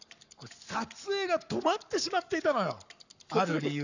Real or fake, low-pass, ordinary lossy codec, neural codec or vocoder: fake; 7.2 kHz; none; codec, 44.1 kHz, 7.8 kbps, Pupu-Codec